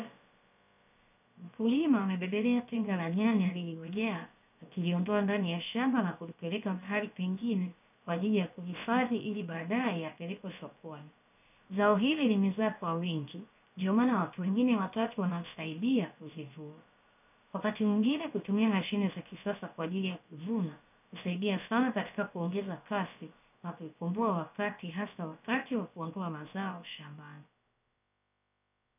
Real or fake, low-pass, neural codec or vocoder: fake; 3.6 kHz; codec, 16 kHz, about 1 kbps, DyCAST, with the encoder's durations